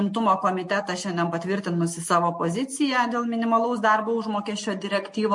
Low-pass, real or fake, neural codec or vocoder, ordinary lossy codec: 10.8 kHz; real; none; MP3, 48 kbps